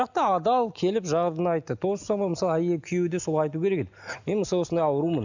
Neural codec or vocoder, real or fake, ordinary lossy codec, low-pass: none; real; none; 7.2 kHz